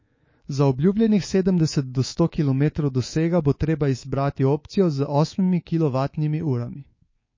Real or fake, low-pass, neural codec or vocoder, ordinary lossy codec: fake; 7.2 kHz; codec, 24 kHz, 3.1 kbps, DualCodec; MP3, 32 kbps